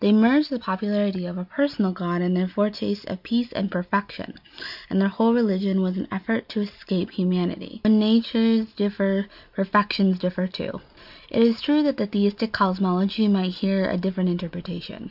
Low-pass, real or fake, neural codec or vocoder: 5.4 kHz; real; none